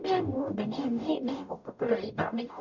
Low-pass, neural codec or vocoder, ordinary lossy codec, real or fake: 7.2 kHz; codec, 44.1 kHz, 0.9 kbps, DAC; none; fake